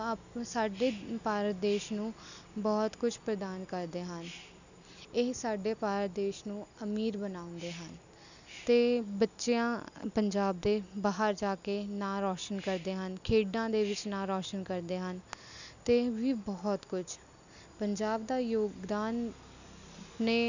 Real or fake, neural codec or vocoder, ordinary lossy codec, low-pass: real; none; none; 7.2 kHz